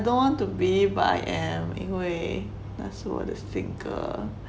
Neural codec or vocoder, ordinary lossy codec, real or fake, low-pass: none; none; real; none